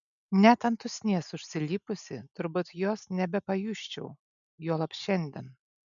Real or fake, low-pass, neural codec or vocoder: real; 7.2 kHz; none